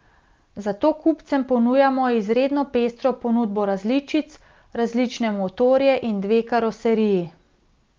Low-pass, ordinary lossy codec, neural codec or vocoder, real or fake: 7.2 kHz; Opus, 24 kbps; none; real